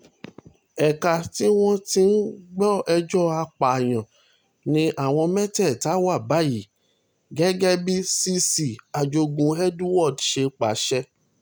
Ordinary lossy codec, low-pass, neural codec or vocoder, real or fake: none; none; none; real